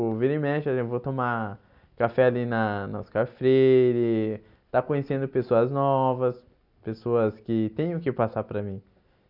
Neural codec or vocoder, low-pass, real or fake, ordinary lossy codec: none; 5.4 kHz; real; none